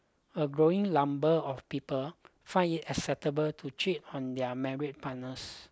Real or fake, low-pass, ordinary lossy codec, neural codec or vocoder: real; none; none; none